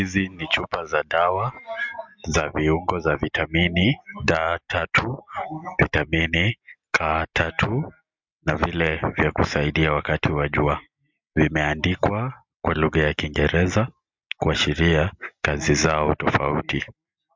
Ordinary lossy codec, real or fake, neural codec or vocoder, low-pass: MP3, 64 kbps; real; none; 7.2 kHz